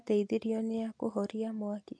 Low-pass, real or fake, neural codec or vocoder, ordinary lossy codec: none; real; none; none